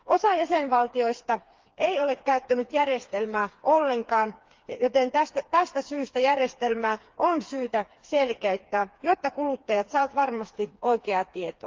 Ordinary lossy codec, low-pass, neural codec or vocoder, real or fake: Opus, 32 kbps; 7.2 kHz; codec, 16 kHz, 4 kbps, FreqCodec, smaller model; fake